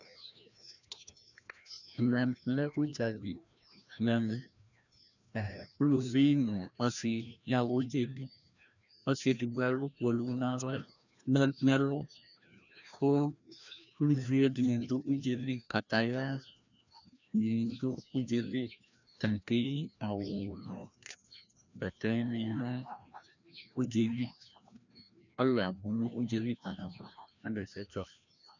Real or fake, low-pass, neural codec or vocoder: fake; 7.2 kHz; codec, 16 kHz, 1 kbps, FreqCodec, larger model